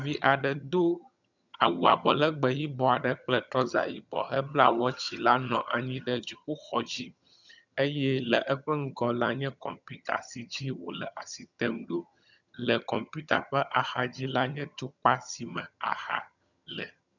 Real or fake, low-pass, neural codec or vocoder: fake; 7.2 kHz; vocoder, 22.05 kHz, 80 mel bands, HiFi-GAN